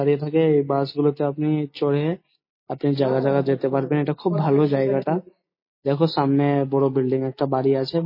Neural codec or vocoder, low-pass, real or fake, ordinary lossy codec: none; 5.4 kHz; real; MP3, 24 kbps